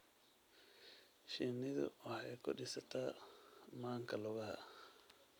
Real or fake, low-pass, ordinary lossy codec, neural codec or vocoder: real; none; none; none